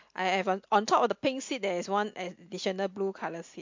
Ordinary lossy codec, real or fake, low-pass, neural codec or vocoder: MP3, 48 kbps; real; 7.2 kHz; none